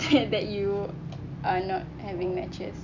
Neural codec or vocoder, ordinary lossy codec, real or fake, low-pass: none; none; real; 7.2 kHz